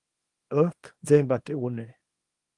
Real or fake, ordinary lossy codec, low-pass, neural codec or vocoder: fake; Opus, 24 kbps; 10.8 kHz; codec, 24 kHz, 0.9 kbps, WavTokenizer, small release